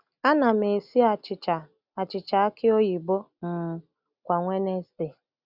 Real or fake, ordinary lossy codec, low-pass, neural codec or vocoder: real; Opus, 64 kbps; 5.4 kHz; none